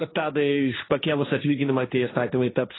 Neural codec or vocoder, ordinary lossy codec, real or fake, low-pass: codec, 16 kHz, 1.1 kbps, Voila-Tokenizer; AAC, 16 kbps; fake; 7.2 kHz